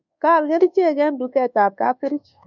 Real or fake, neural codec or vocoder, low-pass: fake; codec, 24 kHz, 1.2 kbps, DualCodec; 7.2 kHz